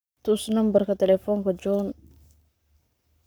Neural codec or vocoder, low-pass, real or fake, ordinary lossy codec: codec, 44.1 kHz, 7.8 kbps, Pupu-Codec; none; fake; none